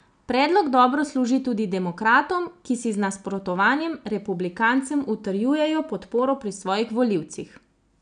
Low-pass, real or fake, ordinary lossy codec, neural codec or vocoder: 9.9 kHz; real; none; none